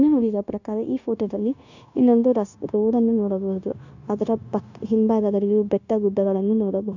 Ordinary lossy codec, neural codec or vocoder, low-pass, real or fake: none; codec, 16 kHz, 0.9 kbps, LongCat-Audio-Codec; 7.2 kHz; fake